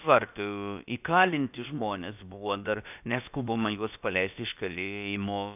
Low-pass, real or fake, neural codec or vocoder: 3.6 kHz; fake; codec, 16 kHz, about 1 kbps, DyCAST, with the encoder's durations